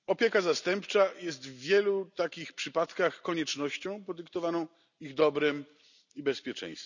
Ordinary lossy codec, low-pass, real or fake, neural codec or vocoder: none; 7.2 kHz; real; none